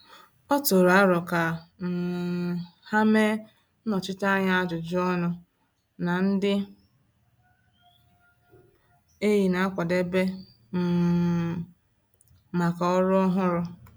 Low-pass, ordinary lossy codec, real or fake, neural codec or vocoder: none; none; real; none